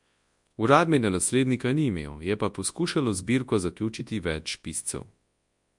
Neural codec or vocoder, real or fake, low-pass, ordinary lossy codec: codec, 24 kHz, 0.9 kbps, WavTokenizer, large speech release; fake; 10.8 kHz; MP3, 64 kbps